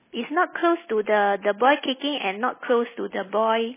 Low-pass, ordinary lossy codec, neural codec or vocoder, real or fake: 3.6 kHz; MP3, 16 kbps; none; real